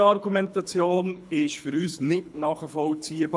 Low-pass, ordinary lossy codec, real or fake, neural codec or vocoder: none; none; fake; codec, 24 kHz, 3 kbps, HILCodec